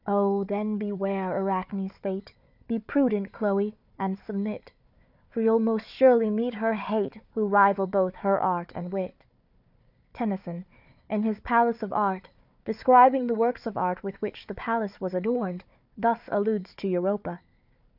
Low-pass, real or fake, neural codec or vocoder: 5.4 kHz; fake; codec, 16 kHz, 4 kbps, FunCodec, trained on Chinese and English, 50 frames a second